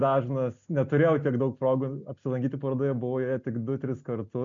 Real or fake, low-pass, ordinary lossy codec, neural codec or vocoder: real; 7.2 kHz; MP3, 64 kbps; none